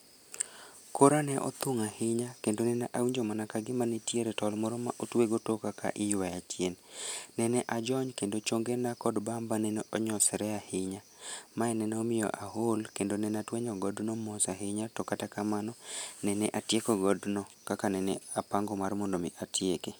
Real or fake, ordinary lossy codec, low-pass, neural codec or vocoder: real; none; none; none